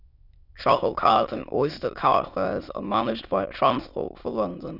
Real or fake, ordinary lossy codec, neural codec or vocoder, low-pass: fake; none; autoencoder, 22.05 kHz, a latent of 192 numbers a frame, VITS, trained on many speakers; 5.4 kHz